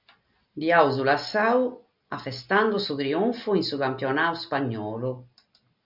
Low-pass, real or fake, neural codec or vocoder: 5.4 kHz; real; none